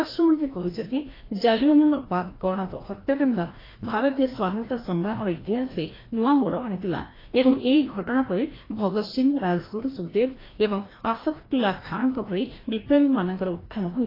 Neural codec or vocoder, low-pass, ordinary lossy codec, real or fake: codec, 16 kHz, 1 kbps, FreqCodec, larger model; 5.4 kHz; AAC, 24 kbps; fake